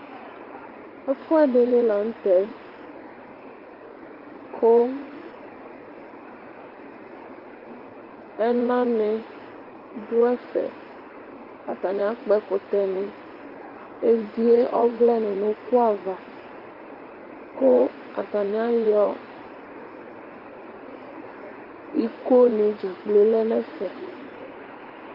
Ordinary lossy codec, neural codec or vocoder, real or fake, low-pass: Opus, 32 kbps; vocoder, 44.1 kHz, 80 mel bands, Vocos; fake; 5.4 kHz